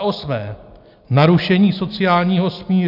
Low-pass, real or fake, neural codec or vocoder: 5.4 kHz; real; none